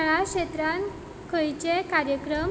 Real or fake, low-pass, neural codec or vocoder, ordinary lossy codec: real; none; none; none